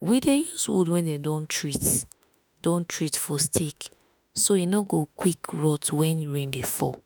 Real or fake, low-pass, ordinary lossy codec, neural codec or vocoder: fake; none; none; autoencoder, 48 kHz, 32 numbers a frame, DAC-VAE, trained on Japanese speech